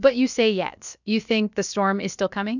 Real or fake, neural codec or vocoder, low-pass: fake; codec, 16 kHz, about 1 kbps, DyCAST, with the encoder's durations; 7.2 kHz